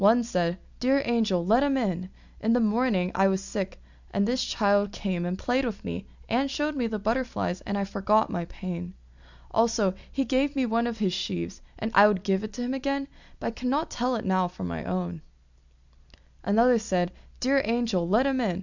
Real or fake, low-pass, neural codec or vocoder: real; 7.2 kHz; none